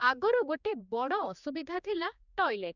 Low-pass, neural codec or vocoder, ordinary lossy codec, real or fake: 7.2 kHz; codec, 16 kHz, 2 kbps, X-Codec, HuBERT features, trained on general audio; none; fake